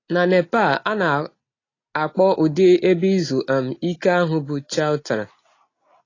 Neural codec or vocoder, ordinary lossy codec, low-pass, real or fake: none; AAC, 32 kbps; 7.2 kHz; real